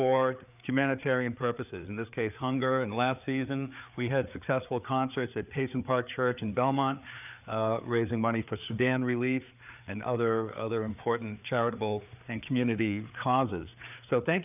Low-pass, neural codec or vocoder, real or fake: 3.6 kHz; codec, 16 kHz, 4 kbps, FreqCodec, larger model; fake